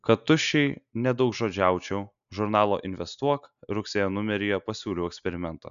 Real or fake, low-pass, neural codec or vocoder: real; 7.2 kHz; none